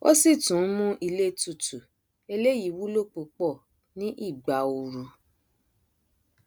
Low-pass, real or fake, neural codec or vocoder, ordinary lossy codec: none; real; none; none